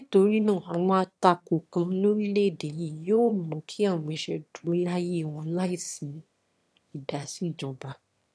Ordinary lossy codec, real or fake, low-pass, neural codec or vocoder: none; fake; none; autoencoder, 22.05 kHz, a latent of 192 numbers a frame, VITS, trained on one speaker